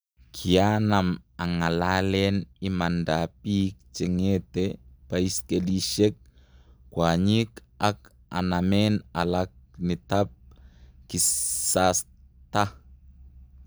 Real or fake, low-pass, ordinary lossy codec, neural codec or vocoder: real; none; none; none